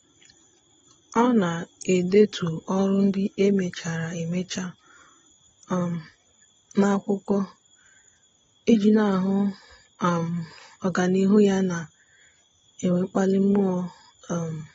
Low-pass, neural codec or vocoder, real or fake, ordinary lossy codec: 7.2 kHz; none; real; AAC, 24 kbps